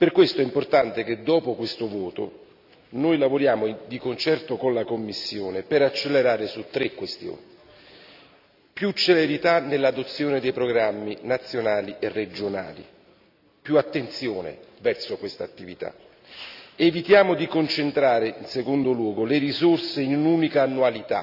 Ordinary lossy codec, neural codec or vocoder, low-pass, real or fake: none; none; 5.4 kHz; real